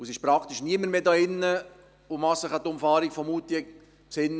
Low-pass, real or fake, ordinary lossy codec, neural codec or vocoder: none; real; none; none